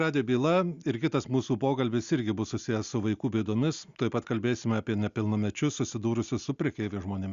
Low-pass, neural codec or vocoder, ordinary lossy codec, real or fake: 7.2 kHz; none; Opus, 64 kbps; real